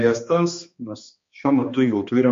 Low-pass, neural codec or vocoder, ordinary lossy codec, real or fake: 7.2 kHz; codec, 16 kHz, 2 kbps, X-Codec, HuBERT features, trained on balanced general audio; MP3, 48 kbps; fake